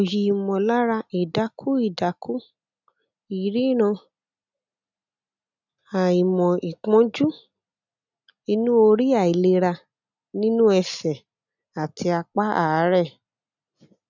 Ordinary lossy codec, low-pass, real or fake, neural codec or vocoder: none; 7.2 kHz; real; none